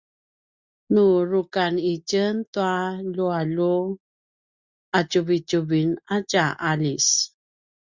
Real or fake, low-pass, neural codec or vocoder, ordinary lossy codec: real; 7.2 kHz; none; Opus, 64 kbps